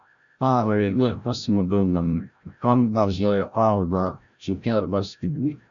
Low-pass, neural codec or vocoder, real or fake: 7.2 kHz; codec, 16 kHz, 0.5 kbps, FreqCodec, larger model; fake